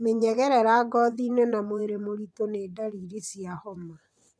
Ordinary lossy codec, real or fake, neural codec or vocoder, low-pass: none; fake; vocoder, 22.05 kHz, 80 mel bands, WaveNeXt; none